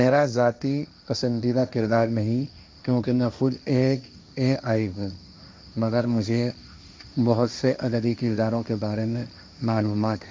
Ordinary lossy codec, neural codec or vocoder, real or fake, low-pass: none; codec, 16 kHz, 1.1 kbps, Voila-Tokenizer; fake; none